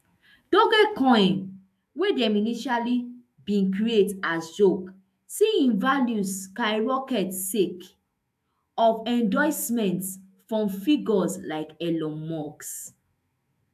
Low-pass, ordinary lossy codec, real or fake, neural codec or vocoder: 14.4 kHz; AAC, 96 kbps; fake; autoencoder, 48 kHz, 128 numbers a frame, DAC-VAE, trained on Japanese speech